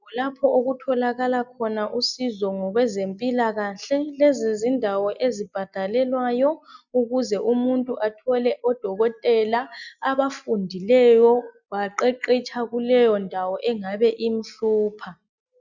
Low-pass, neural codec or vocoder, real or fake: 7.2 kHz; none; real